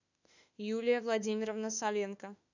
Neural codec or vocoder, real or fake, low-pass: autoencoder, 48 kHz, 32 numbers a frame, DAC-VAE, trained on Japanese speech; fake; 7.2 kHz